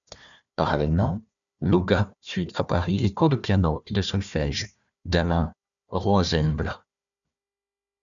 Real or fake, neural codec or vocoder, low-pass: fake; codec, 16 kHz, 1 kbps, FunCodec, trained on Chinese and English, 50 frames a second; 7.2 kHz